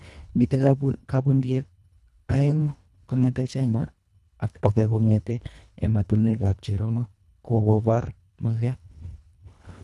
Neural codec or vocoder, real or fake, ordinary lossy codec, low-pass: codec, 24 kHz, 1.5 kbps, HILCodec; fake; none; none